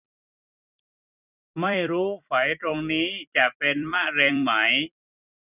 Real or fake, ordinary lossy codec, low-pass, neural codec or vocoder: fake; none; 3.6 kHz; vocoder, 44.1 kHz, 128 mel bands every 256 samples, BigVGAN v2